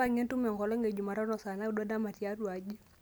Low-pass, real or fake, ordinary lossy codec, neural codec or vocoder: none; real; none; none